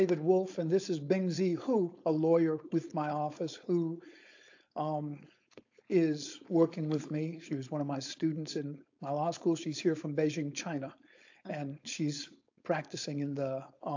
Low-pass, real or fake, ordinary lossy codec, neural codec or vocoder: 7.2 kHz; fake; AAC, 48 kbps; codec, 16 kHz, 4.8 kbps, FACodec